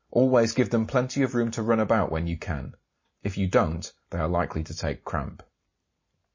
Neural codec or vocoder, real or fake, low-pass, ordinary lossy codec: none; real; 7.2 kHz; MP3, 32 kbps